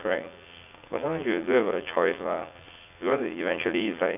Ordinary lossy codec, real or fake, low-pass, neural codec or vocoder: none; fake; 3.6 kHz; vocoder, 44.1 kHz, 80 mel bands, Vocos